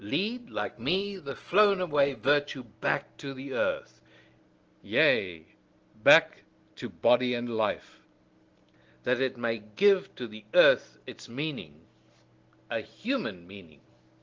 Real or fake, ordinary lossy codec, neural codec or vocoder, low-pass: real; Opus, 16 kbps; none; 7.2 kHz